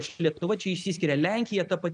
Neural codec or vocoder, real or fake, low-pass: none; real; 9.9 kHz